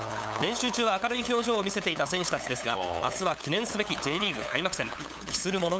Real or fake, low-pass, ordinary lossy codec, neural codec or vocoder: fake; none; none; codec, 16 kHz, 8 kbps, FunCodec, trained on LibriTTS, 25 frames a second